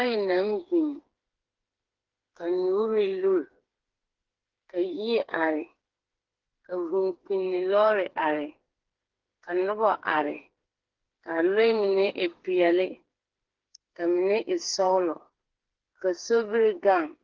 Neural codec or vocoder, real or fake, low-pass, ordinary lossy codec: codec, 16 kHz, 4 kbps, FreqCodec, smaller model; fake; 7.2 kHz; Opus, 16 kbps